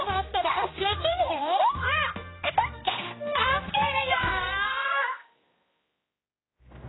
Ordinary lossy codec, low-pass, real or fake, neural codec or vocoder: AAC, 16 kbps; 7.2 kHz; fake; codec, 16 kHz, 1 kbps, X-Codec, HuBERT features, trained on general audio